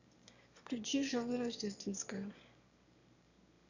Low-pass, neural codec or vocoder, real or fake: 7.2 kHz; autoencoder, 22.05 kHz, a latent of 192 numbers a frame, VITS, trained on one speaker; fake